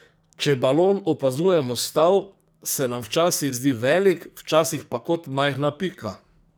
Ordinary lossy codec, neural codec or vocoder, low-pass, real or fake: none; codec, 44.1 kHz, 2.6 kbps, SNAC; none; fake